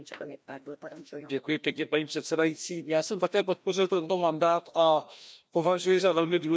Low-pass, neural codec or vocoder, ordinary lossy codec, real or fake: none; codec, 16 kHz, 1 kbps, FreqCodec, larger model; none; fake